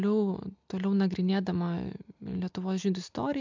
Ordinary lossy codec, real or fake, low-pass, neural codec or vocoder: MP3, 64 kbps; real; 7.2 kHz; none